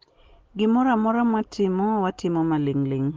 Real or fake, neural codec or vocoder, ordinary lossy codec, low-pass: real; none; Opus, 16 kbps; 7.2 kHz